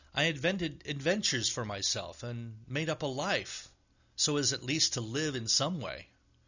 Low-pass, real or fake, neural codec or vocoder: 7.2 kHz; real; none